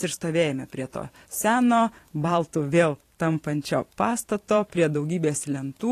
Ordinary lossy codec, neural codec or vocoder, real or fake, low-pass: AAC, 48 kbps; vocoder, 44.1 kHz, 128 mel bands every 512 samples, BigVGAN v2; fake; 14.4 kHz